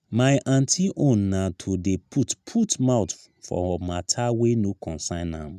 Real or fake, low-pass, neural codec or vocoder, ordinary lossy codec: real; 14.4 kHz; none; none